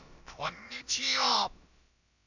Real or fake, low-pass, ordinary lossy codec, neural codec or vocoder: fake; 7.2 kHz; none; codec, 16 kHz, about 1 kbps, DyCAST, with the encoder's durations